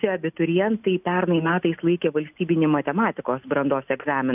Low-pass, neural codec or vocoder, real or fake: 3.6 kHz; none; real